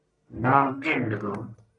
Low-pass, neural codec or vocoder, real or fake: 10.8 kHz; codec, 44.1 kHz, 1.7 kbps, Pupu-Codec; fake